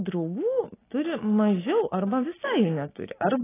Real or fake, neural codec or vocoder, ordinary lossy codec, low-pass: real; none; AAC, 16 kbps; 3.6 kHz